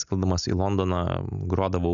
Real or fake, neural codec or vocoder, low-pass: real; none; 7.2 kHz